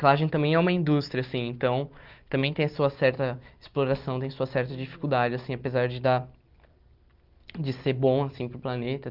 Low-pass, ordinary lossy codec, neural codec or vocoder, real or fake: 5.4 kHz; Opus, 32 kbps; none; real